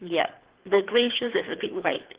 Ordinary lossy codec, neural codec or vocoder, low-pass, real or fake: Opus, 16 kbps; codec, 24 kHz, 3 kbps, HILCodec; 3.6 kHz; fake